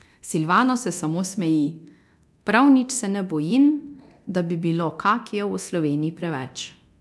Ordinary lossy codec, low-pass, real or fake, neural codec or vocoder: none; none; fake; codec, 24 kHz, 0.9 kbps, DualCodec